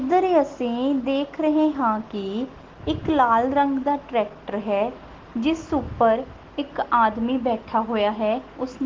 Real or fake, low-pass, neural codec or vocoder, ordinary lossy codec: real; 7.2 kHz; none; Opus, 16 kbps